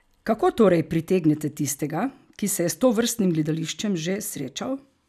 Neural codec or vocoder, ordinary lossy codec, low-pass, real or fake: none; none; 14.4 kHz; real